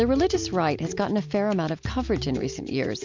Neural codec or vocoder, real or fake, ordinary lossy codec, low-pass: none; real; MP3, 64 kbps; 7.2 kHz